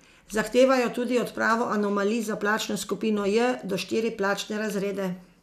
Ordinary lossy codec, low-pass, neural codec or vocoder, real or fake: none; 14.4 kHz; none; real